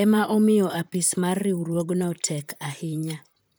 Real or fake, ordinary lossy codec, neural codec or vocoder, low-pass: fake; none; vocoder, 44.1 kHz, 128 mel bands, Pupu-Vocoder; none